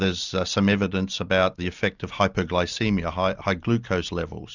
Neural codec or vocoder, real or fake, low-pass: none; real; 7.2 kHz